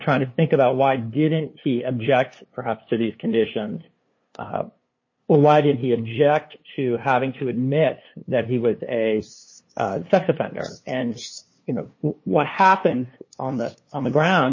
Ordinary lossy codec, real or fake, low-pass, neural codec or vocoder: MP3, 32 kbps; fake; 7.2 kHz; codec, 16 kHz, 2 kbps, FunCodec, trained on LibriTTS, 25 frames a second